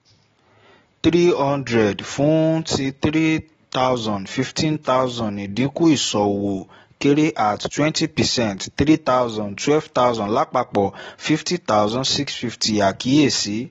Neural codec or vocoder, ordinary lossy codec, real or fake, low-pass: none; AAC, 24 kbps; real; 7.2 kHz